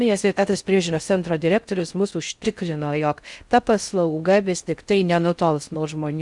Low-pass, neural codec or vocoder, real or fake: 10.8 kHz; codec, 16 kHz in and 24 kHz out, 0.6 kbps, FocalCodec, streaming, 4096 codes; fake